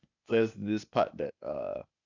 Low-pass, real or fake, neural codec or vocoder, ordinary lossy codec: 7.2 kHz; fake; codec, 16 kHz, 0.8 kbps, ZipCodec; none